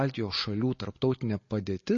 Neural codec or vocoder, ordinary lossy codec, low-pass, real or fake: none; MP3, 32 kbps; 7.2 kHz; real